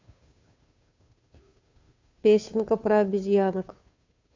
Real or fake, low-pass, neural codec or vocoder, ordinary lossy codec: fake; 7.2 kHz; codec, 16 kHz, 2 kbps, FunCodec, trained on Chinese and English, 25 frames a second; MP3, 48 kbps